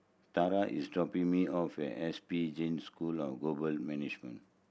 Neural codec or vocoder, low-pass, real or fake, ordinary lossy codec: none; none; real; none